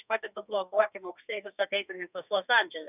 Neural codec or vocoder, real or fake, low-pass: codec, 32 kHz, 1.9 kbps, SNAC; fake; 3.6 kHz